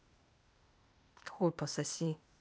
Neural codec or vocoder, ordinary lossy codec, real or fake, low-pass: codec, 16 kHz, 0.8 kbps, ZipCodec; none; fake; none